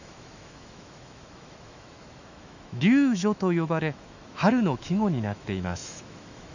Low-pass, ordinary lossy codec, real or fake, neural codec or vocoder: 7.2 kHz; none; fake; autoencoder, 48 kHz, 128 numbers a frame, DAC-VAE, trained on Japanese speech